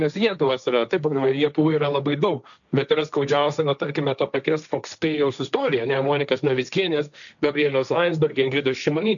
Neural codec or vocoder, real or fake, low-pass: codec, 16 kHz, 1.1 kbps, Voila-Tokenizer; fake; 7.2 kHz